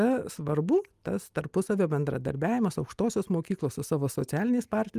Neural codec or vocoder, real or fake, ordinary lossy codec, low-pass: none; real; Opus, 32 kbps; 14.4 kHz